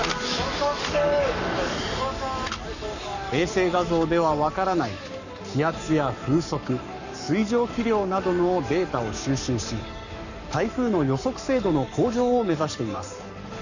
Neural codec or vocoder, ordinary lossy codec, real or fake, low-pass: codec, 44.1 kHz, 7.8 kbps, Pupu-Codec; none; fake; 7.2 kHz